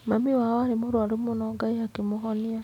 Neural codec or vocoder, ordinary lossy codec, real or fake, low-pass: none; none; real; 19.8 kHz